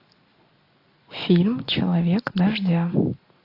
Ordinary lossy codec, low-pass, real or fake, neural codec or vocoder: AAC, 32 kbps; 5.4 kHz; real; none